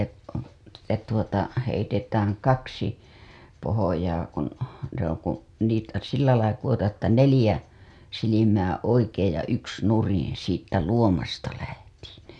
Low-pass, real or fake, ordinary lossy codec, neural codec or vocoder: none; real; none; none